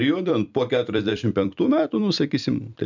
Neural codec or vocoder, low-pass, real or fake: vocoder, 44.1 kHz, 128 mel bands every 256 samples, BigVGAN v2; 7.2 kHz; fake